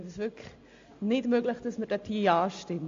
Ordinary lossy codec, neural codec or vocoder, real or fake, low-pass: AAC, 48 kbps; none; real; 7.2 kHz